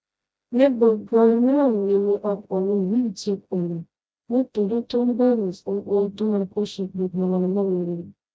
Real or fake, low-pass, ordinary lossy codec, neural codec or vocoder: fake; none; none; codec, 16 kHz, 0.5 kbps, FreqCodec, smaller model